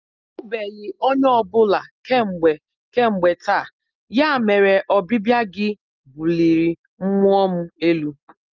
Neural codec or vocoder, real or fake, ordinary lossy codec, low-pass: none; real; Opus, 32 kbps; 7.2 kHz